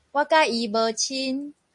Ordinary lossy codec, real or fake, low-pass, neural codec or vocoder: MP3, 48 kbps; real; 10.8 kHz; none